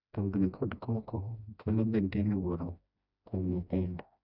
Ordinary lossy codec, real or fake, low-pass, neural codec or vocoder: none; fake; 5.4 kHz; codec, 16 kHz, 1 kbps, FreqCodec, smaller model